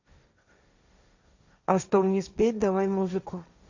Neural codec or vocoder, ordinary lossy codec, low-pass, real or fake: codec, 16 kHz, 1.1 kbps, Voila-Tokenizer; Opus, 64 kbps; 7.2 kHz; fake